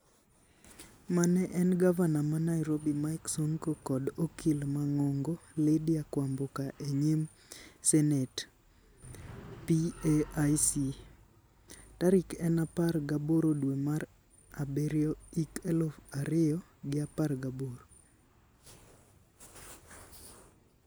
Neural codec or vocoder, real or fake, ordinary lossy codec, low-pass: none; real; none; none